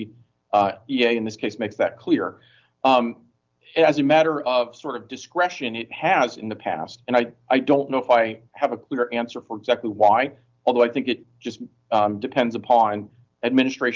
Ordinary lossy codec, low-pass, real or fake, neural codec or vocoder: Opus, 24 kbps; 7.2 kHz; real; none